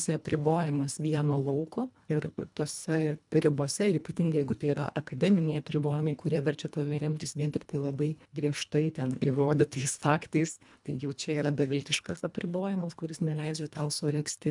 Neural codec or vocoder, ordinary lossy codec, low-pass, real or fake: codec, 24 kHz, 1.5 kbps, HILCodec; MP3, 96 kbps; 10.8 kHz; fake